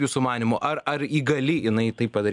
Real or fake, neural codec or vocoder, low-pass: real; none; 10.8 kHz